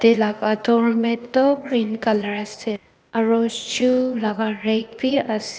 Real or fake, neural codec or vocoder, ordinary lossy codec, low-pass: fake; codec, 16 kHz, 0.8 kbps, ZipCodec; none; none